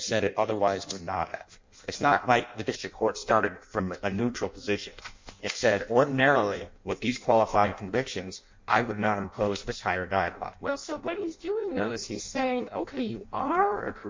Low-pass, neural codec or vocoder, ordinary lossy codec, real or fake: 7.2 kHz; codec, 16 kHz in and 24 kHz out, 0.6 kbps, FireRedTTS-2 codec; MP3, 48 kbps; fake